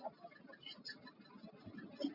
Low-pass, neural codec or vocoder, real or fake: 5.4 kHz; none; real